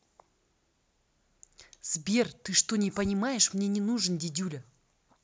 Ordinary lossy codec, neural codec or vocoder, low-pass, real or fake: none; none; none; real